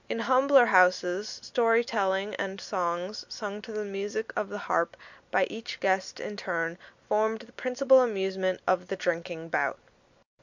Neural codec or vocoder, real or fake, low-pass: none; real; 7.2 kHz